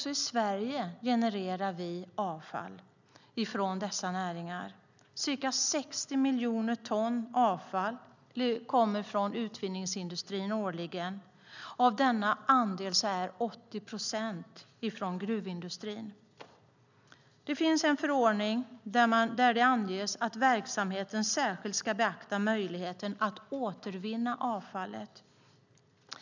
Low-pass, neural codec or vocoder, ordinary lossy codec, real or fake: 7.2 kHz; none; none; real